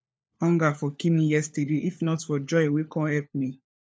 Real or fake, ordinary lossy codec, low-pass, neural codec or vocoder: fake; none; none; codec, 16 kHz, 4 kbps, FunCodec, trained on LibriTTS, 50 frames a second